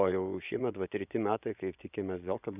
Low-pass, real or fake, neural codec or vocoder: 3.6 kHz; fake; vocoder, 24 kHz, 100 mel bands, Vocos